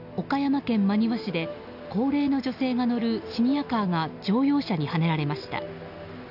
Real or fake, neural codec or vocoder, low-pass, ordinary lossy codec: real; none; 5.4 kHz; none